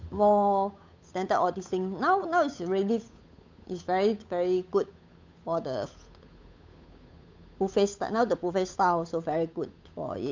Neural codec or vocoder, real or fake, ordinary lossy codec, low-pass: codec, 16 kHz, 8 kbps, FunCodec, trained on Chinese and English, 25 frames a second; fake; MP3, 64 kbps; 7.2 kHz